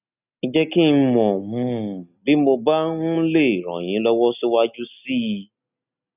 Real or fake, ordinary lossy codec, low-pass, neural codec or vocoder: real; none; 3.6 kHz; none